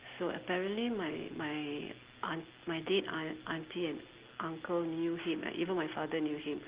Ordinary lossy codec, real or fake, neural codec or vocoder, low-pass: Opus, 16 kbps; real; none; 3.6 kHz